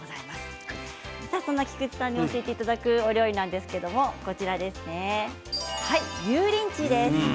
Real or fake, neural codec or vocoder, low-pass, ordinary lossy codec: real; none; none; none